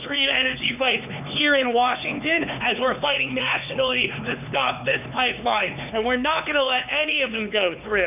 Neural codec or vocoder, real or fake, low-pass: codec, 16 kHz, 4 kbps, X-Codec, HuBERT features, trained on LibriSpeech; fake; 3.6 kHz